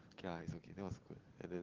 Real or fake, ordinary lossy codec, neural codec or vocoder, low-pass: real; Opus, 16 kbps; none; 7.2 kHz